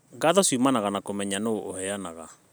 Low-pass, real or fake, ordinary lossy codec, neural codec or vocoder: none; real; none; none